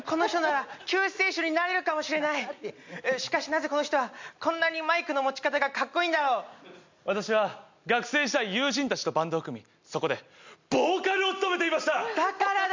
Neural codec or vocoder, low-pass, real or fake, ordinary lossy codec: none; 7.2 kHz; real; MP3, 64 kbps